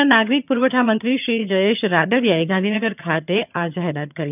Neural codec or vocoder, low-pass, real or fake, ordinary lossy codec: vocoder, 22.05 kHz, 80 mel bands, HiFi-GAN; 3.6 kHz; fake; none